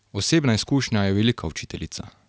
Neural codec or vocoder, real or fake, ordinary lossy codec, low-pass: none; real; none; none